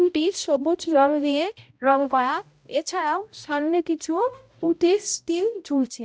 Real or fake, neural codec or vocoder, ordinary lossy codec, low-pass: fake; codec, 16 kHz, 0.5 kbps, X-Codec, HuBERT features, trained on balanced general audio; none; none